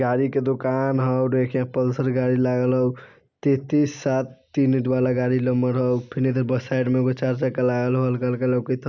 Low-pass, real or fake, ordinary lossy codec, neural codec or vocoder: 7.2 kHz; real; none; none